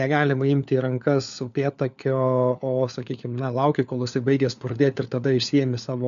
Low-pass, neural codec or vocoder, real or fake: 7.2 kHz; codec, 16 kHz, 4 kbps, FunCodec, trained on LibriTTS, 50 frames a second; fake